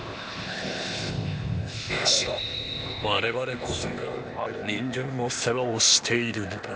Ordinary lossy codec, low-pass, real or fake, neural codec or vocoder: none; none; fake; codec, 16 kHz, 0.8 kbps, ZipCodec